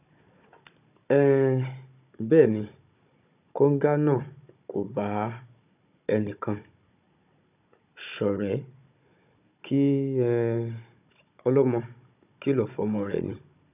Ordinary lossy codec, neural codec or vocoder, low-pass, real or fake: none; codec, 16 kHz, 16 kbps, FunCodec, trained on Chinese and English, 50 frames a second; 3.6 kHz; fake